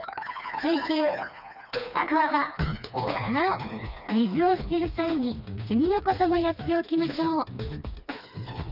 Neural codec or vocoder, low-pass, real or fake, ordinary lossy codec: codec, 16 kHz, 2 kbps, FreqCodec, smaller model; 5.4 kHz; fake; none